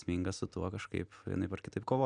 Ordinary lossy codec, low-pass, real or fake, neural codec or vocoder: MP3, 96 kbps; 9.9 kHz; real; none